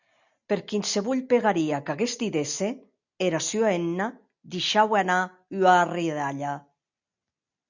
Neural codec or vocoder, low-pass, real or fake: none; 7.2 kHz; real